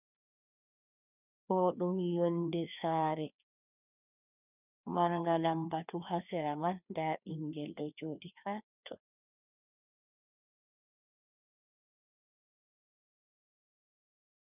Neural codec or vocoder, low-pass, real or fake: codec, 16 kHz, 2 kbps, FreqCodec, larger model; 3.6 kHz; fake